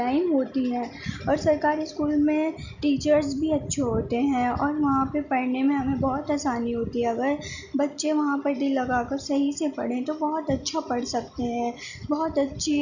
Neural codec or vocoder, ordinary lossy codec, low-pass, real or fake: none; none; 7.2 kHz; real